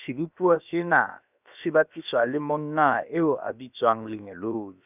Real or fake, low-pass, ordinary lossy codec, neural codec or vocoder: fake; 3.6 kHz; none; codec, 16 kHz, about 1 kbps, DyCAST, with the encoder's durations